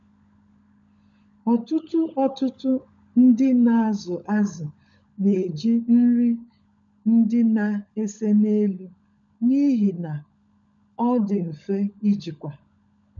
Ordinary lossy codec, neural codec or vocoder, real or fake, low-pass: none; codec, 16 kHz, 16 kbps, FunCodec, trained on LibriTTS, 50 frames a second; fake; 7.2 kHz